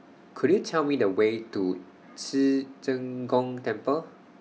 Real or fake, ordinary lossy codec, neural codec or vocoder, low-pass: real; none; none; none